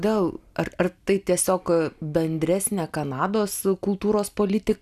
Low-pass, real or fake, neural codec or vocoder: 14.4 kHz; real; none